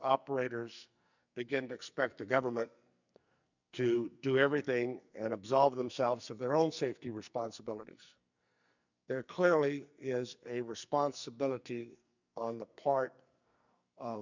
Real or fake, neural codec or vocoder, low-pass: fake; codec, 44.1 kHz, 2.6 kbps, SNAC; 7.2 kHz